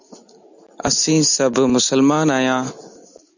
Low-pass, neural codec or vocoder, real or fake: 7.2 kHz; none; real